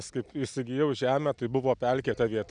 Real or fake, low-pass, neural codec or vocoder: real; 9.9 kHz; none